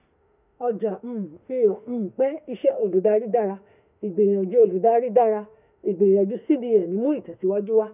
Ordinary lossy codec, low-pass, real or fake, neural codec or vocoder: none; 3.6 kHz; fake; autoencoder, 48 kHz, 32 numbers a frame, DAC-VAE, trained on Japanese speech